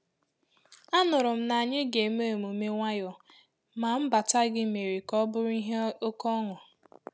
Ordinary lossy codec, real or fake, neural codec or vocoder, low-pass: none; real; none; none